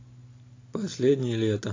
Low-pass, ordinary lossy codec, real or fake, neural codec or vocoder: 7.2 kHz; AAC, 32 kbps; real; none